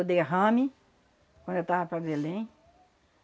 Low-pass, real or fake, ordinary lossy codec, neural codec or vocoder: none; real; none; none